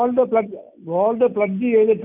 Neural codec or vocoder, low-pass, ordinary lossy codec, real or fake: none; 3.6 kHz; none; real